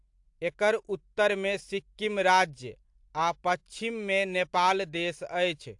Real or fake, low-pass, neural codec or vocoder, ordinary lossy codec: real; 10.8 kHz; none; AAC, 64 kbps